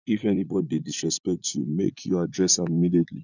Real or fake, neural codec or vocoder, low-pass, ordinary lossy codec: fake; vocoder, 44.1 kHz, 80 mel bands, Vocos; 7.2 kHz; none